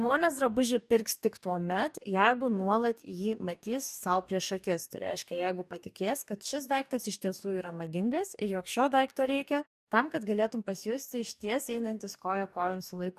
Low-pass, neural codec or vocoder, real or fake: 14.4 kHz; codec, 44.1 kHz, 2.6 kbps, DAC; fake